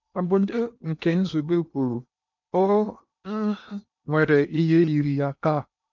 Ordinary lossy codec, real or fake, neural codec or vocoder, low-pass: none; fake; codec, 16 kHz in and 24 kHz out, 0.8 kbps, FocalCodec, streaming, 65536 codes; 7.2 kHz